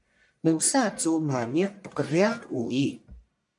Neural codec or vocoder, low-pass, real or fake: codec, 44.1 kHz, 1.7 kbps, Pupu-Codec; 10.8 kHz; fake